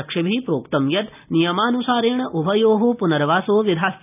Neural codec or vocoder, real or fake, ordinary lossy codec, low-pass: none; real; none; 3.6 kHz